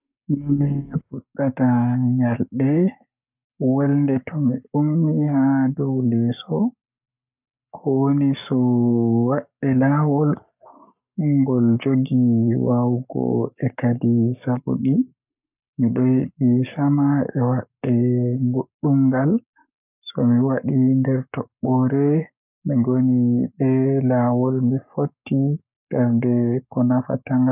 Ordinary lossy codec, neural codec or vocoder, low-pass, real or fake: none; codec, 44.1 kHz, 7.8 kbps, Pupu-Codec; 3.6 kHz; fake